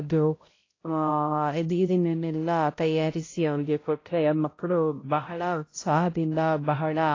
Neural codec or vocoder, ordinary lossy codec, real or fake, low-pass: codec, 16 kHz, 0.5 kbps, X-Codec, HuBERT features, trained on balanced general audio; AAC, 32 kbps; fake; 7.2 kHz